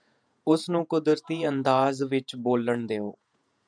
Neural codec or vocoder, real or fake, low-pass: vocoder, 48 kHz, 128 mel bands, Vocos; fake; 9.9 kHz